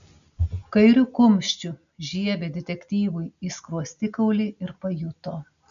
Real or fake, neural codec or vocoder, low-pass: real; none; 7.2 kHz